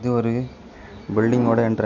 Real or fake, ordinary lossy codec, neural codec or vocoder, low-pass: real; none; none; 7.2 kHz